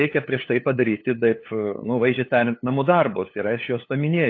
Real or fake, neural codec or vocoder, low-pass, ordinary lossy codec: fake; codec, 16 kHz, 8 kbps, FunCodec, trained on LibriTTS, 25 frames a second; 7.2 kHz; AAC, 32 kbps